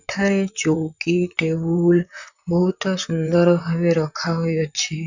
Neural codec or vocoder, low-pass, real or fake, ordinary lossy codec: vocoder, 44.1 kHz, 128 mel bands, Pupu-Vocoder; 7.2 kHz; fake; none